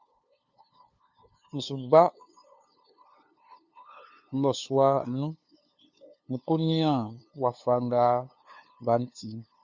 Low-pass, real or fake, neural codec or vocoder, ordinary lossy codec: 7.2 kHz; fake; codec, 16 kHz, 2 kbps, FunCodec, trained on LibriTTS, 25 frames a second; Opus, 64 kbps